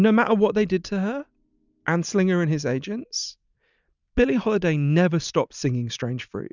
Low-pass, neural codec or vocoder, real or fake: 7.2 kHz; none; real